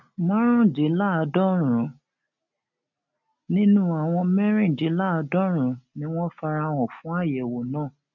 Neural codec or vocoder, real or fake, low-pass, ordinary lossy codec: none; real; 7.2 kHz; none